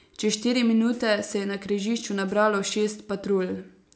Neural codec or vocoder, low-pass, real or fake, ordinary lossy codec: none; none; real; none